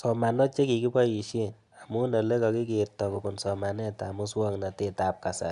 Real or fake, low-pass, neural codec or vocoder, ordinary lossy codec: real; 10.8 kHz; none; none